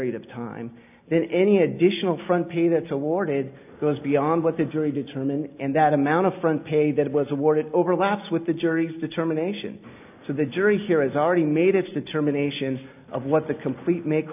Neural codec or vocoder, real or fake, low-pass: none; real; 3.6 kHz